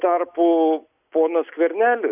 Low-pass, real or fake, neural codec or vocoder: 3.6 kHz; real; none